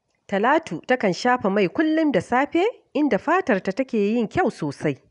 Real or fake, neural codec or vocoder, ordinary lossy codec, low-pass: real; none; none; 10.8 kHz